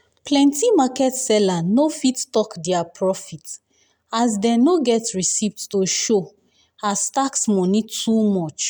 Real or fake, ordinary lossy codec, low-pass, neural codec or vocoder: real; none; none; none